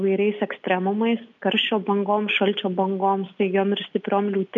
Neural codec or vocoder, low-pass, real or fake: none; 7.2 kHz; real